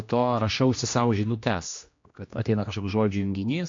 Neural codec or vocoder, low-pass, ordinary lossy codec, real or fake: codec, 16 kHz, 1 kbps, X-Codec, HuBERT features, trained on general audio; 7.2 kHz; MP3, 48 kbps; fake